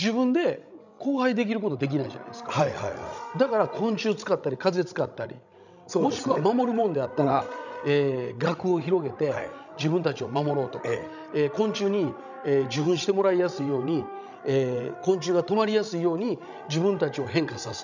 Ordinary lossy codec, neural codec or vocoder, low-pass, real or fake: none; codec, 16 kHz, 16 kbps, FreqCodec, larger model; 7.2 kHz; fake